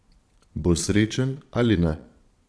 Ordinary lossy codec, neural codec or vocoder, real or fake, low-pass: none; vocoder, 22.05 kHz, 80 mel bands, Vocos; fake; none